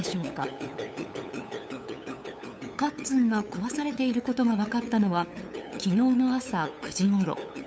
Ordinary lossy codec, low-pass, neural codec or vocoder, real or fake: none; none; codec, 16 kHz, 8 kbps, FunCodec, trained on LibriTTS, 25 frames a second; fake